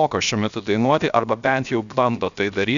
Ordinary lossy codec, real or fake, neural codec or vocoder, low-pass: MP3, 96 kbps; fake; codec, 16 kHz, 0.7 kbps, FocalCodec; 7.2 kHz